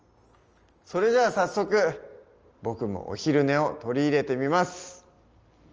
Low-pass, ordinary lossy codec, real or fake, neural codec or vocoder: 7.2 kHz; Opus, 24 kbps; real; none